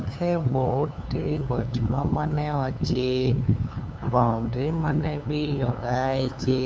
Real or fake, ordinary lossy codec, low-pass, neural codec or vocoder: fake; none; none; codec, 16 kHz, 2 kbps, FunCodec, trained on LibriTTS, 25 frames a second